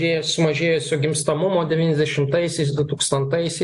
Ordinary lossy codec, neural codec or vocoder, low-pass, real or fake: AAC, 64 kbps; none; 10.8 kHz; real